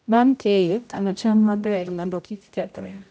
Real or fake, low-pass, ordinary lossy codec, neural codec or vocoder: fake; none; none; codec, 16 kHz, 0.5 kbps, X-Codec, HuBERT features, trained on general audio